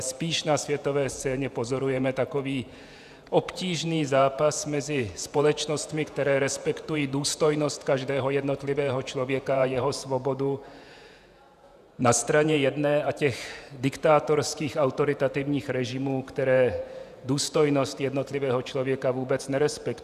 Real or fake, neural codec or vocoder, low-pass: fake; vocoder, 48 kHz, 128 mel bands, Vocos; 14.4 kHz